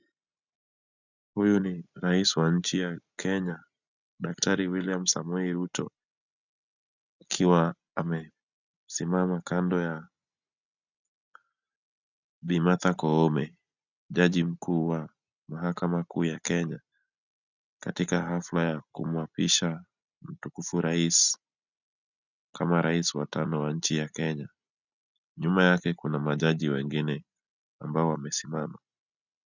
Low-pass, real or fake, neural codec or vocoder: 7.2 kHz; real; none